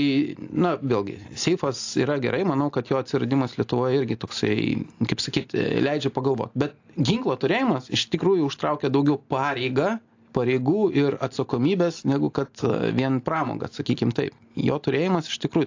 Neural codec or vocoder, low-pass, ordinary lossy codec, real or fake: none; 7.2 kHz; AAC, 48 kbps; real